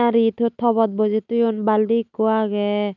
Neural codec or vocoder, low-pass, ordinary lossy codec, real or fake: none; 7.2 kHz; none; real